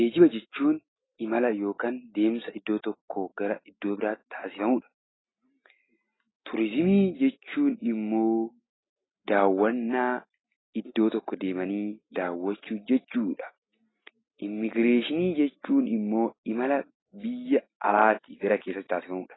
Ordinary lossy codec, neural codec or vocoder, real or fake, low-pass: AAC, 16 kbps; none; real; 7.2 kHz